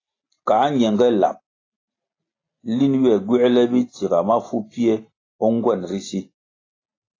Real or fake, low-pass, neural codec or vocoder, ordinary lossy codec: real; 7.2 kHz; none; AAC, 32 kbps